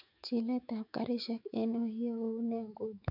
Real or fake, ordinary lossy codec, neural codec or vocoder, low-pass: fake; none; autoencoder, 48 kHz, 128 numbers a frame, DAC-VAE, trained on Japanese speech; 5.4 kHz